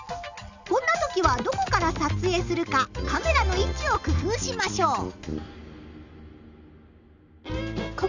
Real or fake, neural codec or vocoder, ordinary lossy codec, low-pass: real; none; none; 7.2 kHz